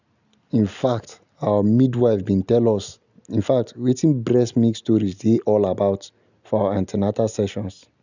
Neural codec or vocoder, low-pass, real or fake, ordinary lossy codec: none; 7.2 kHz; real; none